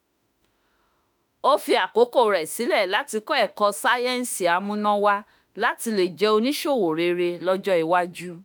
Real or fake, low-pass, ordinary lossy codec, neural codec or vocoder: fake; none; none; autoencoder, 48 kHz, 32 numbers a frame, DAC-VAE, trained on Japanese speech